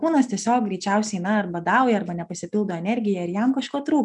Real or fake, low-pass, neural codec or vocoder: real; 10.8 kHz; none